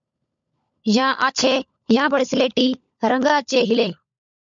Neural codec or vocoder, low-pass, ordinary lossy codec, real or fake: codec, 16 kHz, 16 kbps, FunCodec, trained on LibriTTS, 50 frames a second; 7.2 kHz; MP3, 64 kbps; fake